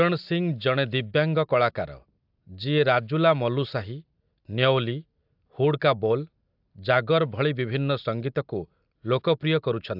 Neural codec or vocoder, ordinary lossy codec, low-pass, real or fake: none; AAC, 48 kbps; 5.4 kHz; real